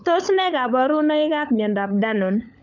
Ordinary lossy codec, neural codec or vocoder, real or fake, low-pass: none; codec, 16 kHz, 16 kbps, FunCodec, trained on LibriTTS, 50 frames a second; fake; 7.2 kHz